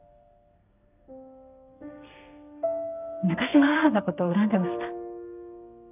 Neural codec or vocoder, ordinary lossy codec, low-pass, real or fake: codec, 32 kHz, 1.9 kbps, SNAC; none; 3.6 kHz; fake